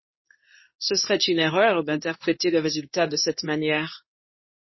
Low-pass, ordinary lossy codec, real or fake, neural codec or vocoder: 7.2 kHz; MP3, 24 kbps; fake; codec, 24 kHz, 0.9 kbps, WavTokenizer, medium speech release version 2